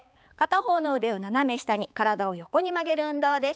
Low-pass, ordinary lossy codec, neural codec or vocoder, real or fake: none; none; codec, 16 kHz, 4 kbps, X-Codec, HuBERT features, trained on balanced general audio; fake